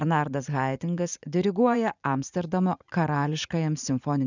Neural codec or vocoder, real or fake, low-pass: vocoder, 44.1 kHz, 80 mel bands, Vocos; fake; 7.2 kHz